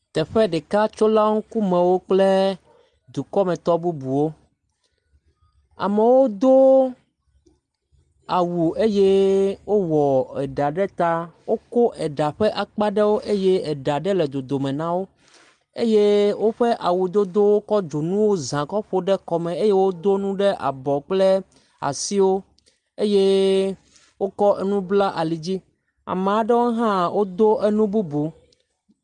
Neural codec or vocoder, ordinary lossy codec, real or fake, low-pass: none; Opus, 32 kbps; real; 9.9 kHz